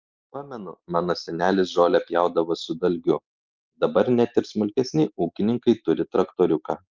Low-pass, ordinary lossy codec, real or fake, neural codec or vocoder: 7.2 kHz; Opus, 24 kbps; real; none